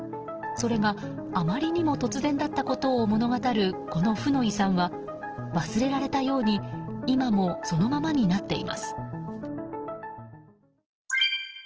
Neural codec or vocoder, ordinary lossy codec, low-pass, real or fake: none; Opus, 16 kbps; 7.2 kHz; real